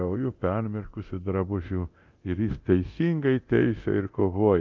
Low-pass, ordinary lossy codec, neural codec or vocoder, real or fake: 7.2 kHz; Opus, 32 kbps; codec, 24 kHz, 0.9 kbps, DualCodec; fake